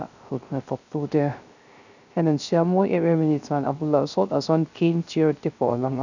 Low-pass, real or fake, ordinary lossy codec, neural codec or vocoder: 7.2 kHz; fake; none; codec, 16 kHz, 0.3 kbps, FocalCodec